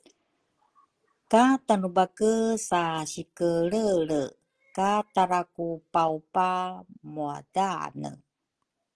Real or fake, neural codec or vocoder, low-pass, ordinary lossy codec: real; none; 10.8 kHz; Opus, 16 kbps